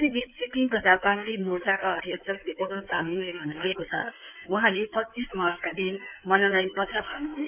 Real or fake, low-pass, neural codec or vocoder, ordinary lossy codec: fake; 3.6 kHz; codec, 16 kHz in and 24 kHz out, 2.2 kbps, FireRedTTS-2 codec; none